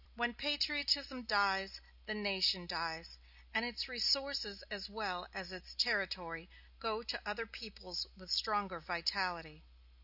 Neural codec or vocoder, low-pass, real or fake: none; 5.4 kHz; real